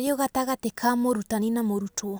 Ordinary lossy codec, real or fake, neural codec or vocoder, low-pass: none; real; none; none